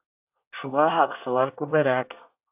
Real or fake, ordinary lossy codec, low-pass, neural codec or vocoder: fake; AAC, 32 kbps; 3.6 kHz; codec, 24 kHz, 1 kbps, SNAC